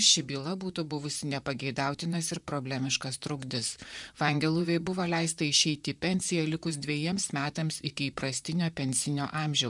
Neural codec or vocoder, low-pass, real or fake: vocoder, 44.1 kHz, 128 mel bands, Pupu-Vocoder; 10.8 kHz; fake